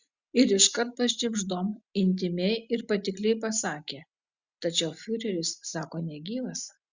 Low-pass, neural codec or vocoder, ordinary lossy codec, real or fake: 7.2 kHz; none; Opus, 64 kbps; real